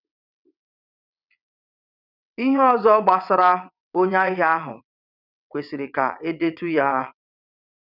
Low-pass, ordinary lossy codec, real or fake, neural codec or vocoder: 5.4 kHz; none; fake; vocoder, 22.05 kHz, 80 mel bands, WaveNeXt